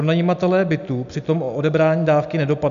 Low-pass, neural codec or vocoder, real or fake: 7.2 kHz; none; real